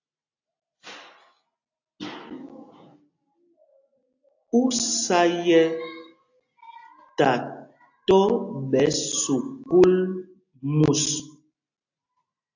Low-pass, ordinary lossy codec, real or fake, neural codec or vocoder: 7.2 kHz; AAC, 48 kbps; real; none